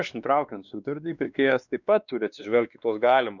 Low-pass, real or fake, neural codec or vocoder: 7.2 kHz; fake; codec, 16 kHz, 2 kbps, X-Codec, WavLM features, trained on Multilingual LibriSpeech